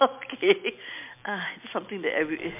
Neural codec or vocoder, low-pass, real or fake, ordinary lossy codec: none; 3.6 kHz; real; MP3, 32 kbps